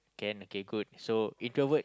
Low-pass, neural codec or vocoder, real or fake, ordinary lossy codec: none; none; real; none